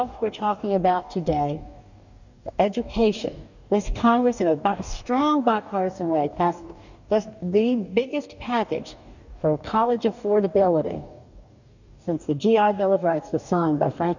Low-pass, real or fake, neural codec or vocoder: 7.2 kHz; fake; codec, 44.1 kHz, 2.6 kbps, DAC